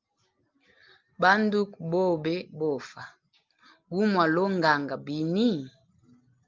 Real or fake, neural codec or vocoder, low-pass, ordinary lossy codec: real; none; 7.2 kHz; Opus, 32 kbps